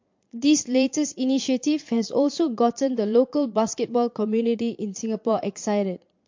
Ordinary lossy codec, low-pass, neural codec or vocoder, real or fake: MP3, 48 kbps; 7.2 kHz; vocoder, 22.05 kHz, 80 mel bands, Vocos; fake